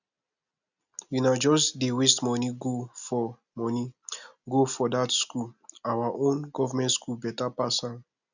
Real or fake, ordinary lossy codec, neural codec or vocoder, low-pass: real; none; none; 7.2 kHz